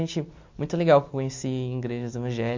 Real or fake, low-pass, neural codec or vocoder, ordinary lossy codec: real; 7.2 kHz; none; MP3, 48 kbps